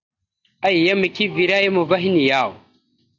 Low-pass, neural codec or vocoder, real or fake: 7.2 kHz; none; real